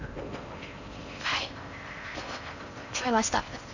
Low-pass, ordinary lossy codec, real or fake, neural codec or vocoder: 7.2 kHz; none; fake; codec, 16 kHz in and 24 kHz out, 0.6 kbps, FocalCodec, streaming, 4096 codes